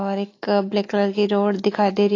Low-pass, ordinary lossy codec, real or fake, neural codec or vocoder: 7.2 kHz; AAC, 32 kbps; fake; autoencoder, 48 kHz, 128 numbers a frame, DAC-VAE, trained on Japanese speech